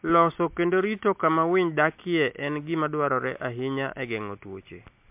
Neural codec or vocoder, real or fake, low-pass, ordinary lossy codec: none; real; 3.6 kHz; MP3, 32 kbps